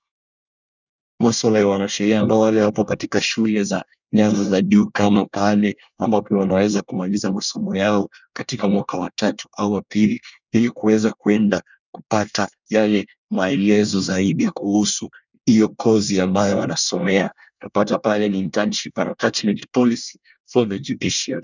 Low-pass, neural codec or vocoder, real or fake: 7.2 kHz; codec, 24 kHz, 1 kbps, SNAC; fake